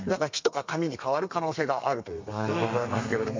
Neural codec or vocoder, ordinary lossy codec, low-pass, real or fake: codec, 32 kHz, 1.9 kbps, SNAC; MP3, 64 kbps; 7.2 kHz; fake